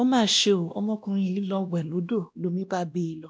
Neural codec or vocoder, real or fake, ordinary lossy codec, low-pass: codec, 16 kHz, 1 kbps, X-Codec, WavLM features, trained on Multilingual LibriSpeech; fake; none; none